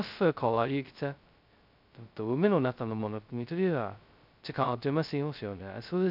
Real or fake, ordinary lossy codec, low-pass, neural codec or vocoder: fake; none; 5.4 kHz; codec, 16 kHz, 0.2 kbps, FocalCodec